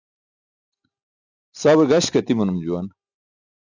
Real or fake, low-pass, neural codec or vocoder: real; 7.2 kHz; none